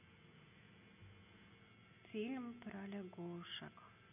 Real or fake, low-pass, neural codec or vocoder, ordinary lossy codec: real; 3.6 kHz; none; none